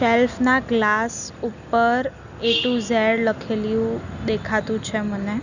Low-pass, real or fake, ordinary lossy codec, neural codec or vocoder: 7.2 kHz; real; none; none